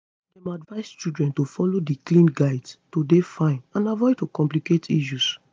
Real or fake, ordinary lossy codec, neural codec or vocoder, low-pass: real; none; none; none